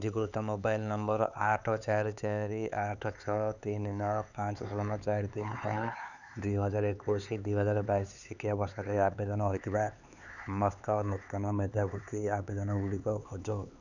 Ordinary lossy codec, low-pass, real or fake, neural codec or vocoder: none; 7.2 kHz; fake; codec, 16 kHz, 4 kbps, X-Codec, HuBERT features, trained on LibriSpeech